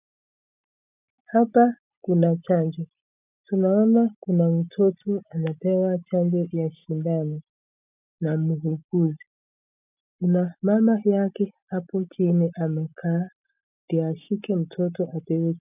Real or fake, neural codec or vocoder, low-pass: real; none; 3.6 kHz